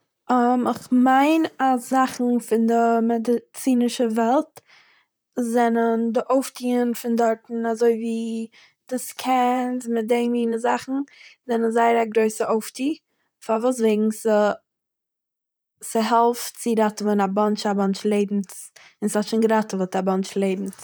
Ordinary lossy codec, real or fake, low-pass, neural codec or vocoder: none; fake; none; vocoder, 44.1 kHz, 128 mel bands, Pupu-Vocoder